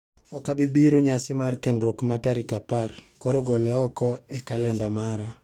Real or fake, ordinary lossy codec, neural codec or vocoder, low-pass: fake; MP3, 96 kbps; codec, 44.1 kHz, 2.6 kbps, DAC; 19.8 kHz